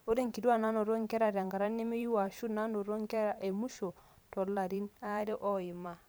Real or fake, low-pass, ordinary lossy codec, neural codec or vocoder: fake; none; none; vocoder, 44.1 kHz, 128 mel bands every 512 samples, BigVGAN v2